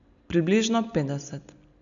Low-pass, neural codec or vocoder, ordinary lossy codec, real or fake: 7.2 kHz; none; none; real